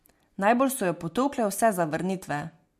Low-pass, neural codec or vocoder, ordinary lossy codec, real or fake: 14.4 kHz; none; MP3, 64 kbps; real